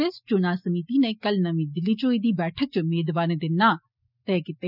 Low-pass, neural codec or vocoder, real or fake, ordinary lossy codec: 5.4 kHz; none; real; none